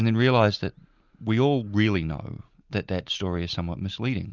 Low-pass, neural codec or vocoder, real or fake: 7.2 kHz; none; real